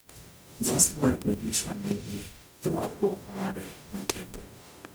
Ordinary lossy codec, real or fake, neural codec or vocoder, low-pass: none; fake; codec, 44.1 kHz, 0.9 kbps, DAC; none